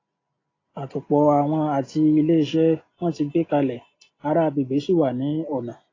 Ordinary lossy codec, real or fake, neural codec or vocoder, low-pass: AAC, 32 kbps; real; none; 7.2 kHz